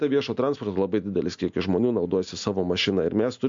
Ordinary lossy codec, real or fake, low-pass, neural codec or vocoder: MP3, 64 kbps; real; 7.2 kHz; none